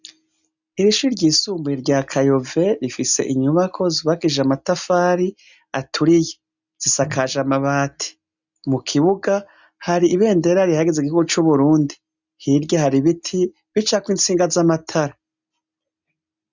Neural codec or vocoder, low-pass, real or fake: none; 7.2 kHz; real